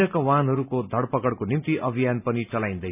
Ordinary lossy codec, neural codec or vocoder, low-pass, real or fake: none; none; 3.6 kHz; real